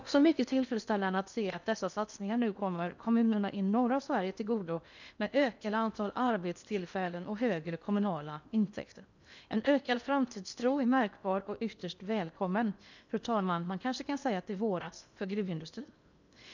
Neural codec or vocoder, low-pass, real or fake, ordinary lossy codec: codec, 16 kHz in and 24 kHz out, 0.8 kbps, FocalCodec, streaming, 65536 codes; 7.2 kHz; fake; none